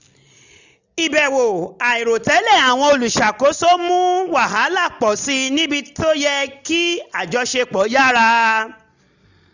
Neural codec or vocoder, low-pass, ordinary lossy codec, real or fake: none; 7.2 kHz; none; real